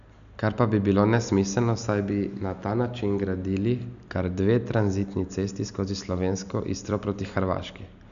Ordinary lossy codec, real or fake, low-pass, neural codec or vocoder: none; real; 7.2 kHz; none